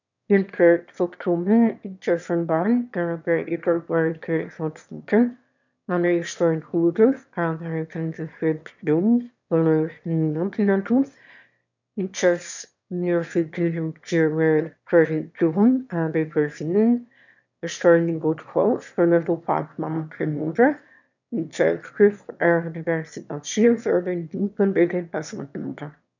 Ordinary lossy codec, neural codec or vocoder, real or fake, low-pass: none; autoencoder, 22.05 kHz, a latent of 192 numbers a frame, VITS, trained on one speaker; fake; 7.2 kHz